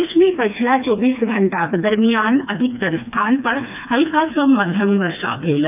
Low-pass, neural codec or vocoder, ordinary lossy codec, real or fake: 3.6 kHz; codec, 16 kHz, 2 kbps, FreqCodec, smaller model; none; fake